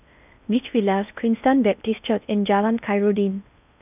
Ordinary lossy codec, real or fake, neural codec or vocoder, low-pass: none; fake; codec, 16 kHz in and 24 kHz out, 0.6 kbps, FocalCodec, streaming, 4096 codes; 3.6 kHz